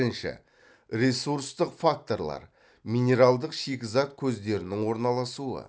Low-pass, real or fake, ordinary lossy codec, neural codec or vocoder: none; real; none; none